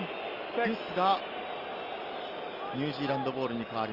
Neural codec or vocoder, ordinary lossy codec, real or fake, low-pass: none; Opus, 24 kbps; real; 5.4 kHz